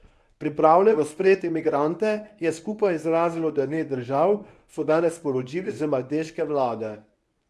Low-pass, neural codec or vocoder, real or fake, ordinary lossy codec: none; codec, 24 kHz, 0.9 kbps, WavTokenizer, medium speech release version 1; fake; none